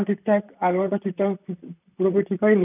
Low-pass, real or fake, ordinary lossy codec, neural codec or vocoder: 3.6 kHz; fake; none; vocoder, 44.1 kHz, 128 mel bands, Pupu-Vocoder